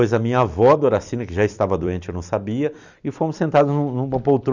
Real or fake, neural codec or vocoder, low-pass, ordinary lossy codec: fake; vocoder, 44.1 kHz, 128 mel bands every 256 samples, BigVGAN v2; 7.2 kHz; none